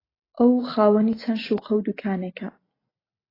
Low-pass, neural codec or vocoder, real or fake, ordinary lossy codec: 5.4 kHz; none; real; AAC, 24 kbps